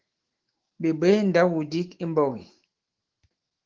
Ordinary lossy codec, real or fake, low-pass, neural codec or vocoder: Opus, 16 kbps; fake; 7.2 kHz; autoencoder, 48 kHz, 128 numbers a frame, DAC-VAE, trained on Japanese speech